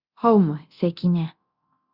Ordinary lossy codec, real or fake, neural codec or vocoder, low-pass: Opus, 64 kbps; fake; codec, 24 kHz, 0.9 kbps, DualCodec; 5.4 kHz